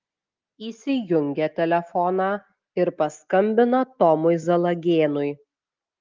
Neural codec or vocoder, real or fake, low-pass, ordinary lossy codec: none; real; 7.2 kHz; Opus, 32 kbps